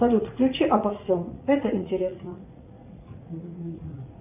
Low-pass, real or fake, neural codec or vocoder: 3.6 kHz; fake; vocoder, 44.1 kHz, 80 mel bands, Vocos